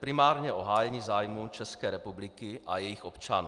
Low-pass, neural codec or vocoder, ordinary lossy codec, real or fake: 10.8 kHz; none; Opus, 32 kbps; real